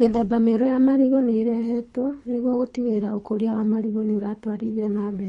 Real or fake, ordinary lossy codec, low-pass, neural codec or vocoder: fake; MP3, 48 kbps; 10.8 kHz; codec, 24 kHz, 3 kbps, HILCodec